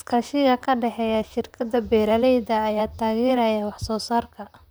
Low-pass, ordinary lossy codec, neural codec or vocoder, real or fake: none; none; vocoder, 44.1 kHz, 128 mel bands, Pupu-Vocoder; fake